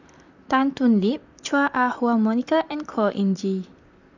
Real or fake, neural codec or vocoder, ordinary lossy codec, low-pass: fake; vocoder, 44.1 kHz, 128 mel bands, Pupu-Vocoder; none; 7.2 kHz